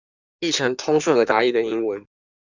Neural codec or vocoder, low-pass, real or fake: codec, 16 kHz in and 24 kHz out, 1.1 kbps, FireRedTTS-2 codec; 7.2 kHz; fake